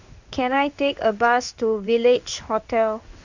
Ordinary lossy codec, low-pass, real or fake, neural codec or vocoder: none; 7.2 kHz; fake; codec, 16 kHz in and 24 kHz out, 1 kbps, XY-Tokenizer